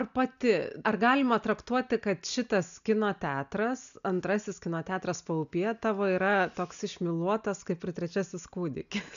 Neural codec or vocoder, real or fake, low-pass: none; real; 7.2 kHz